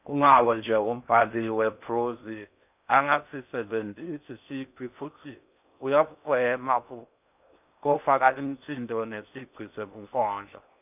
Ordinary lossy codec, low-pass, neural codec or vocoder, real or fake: none; 3.6 kHz; codec, 16 kHz in and 24 kHz out, 0.6 kbps, FocalCodec, streaming, 4096 codes; fake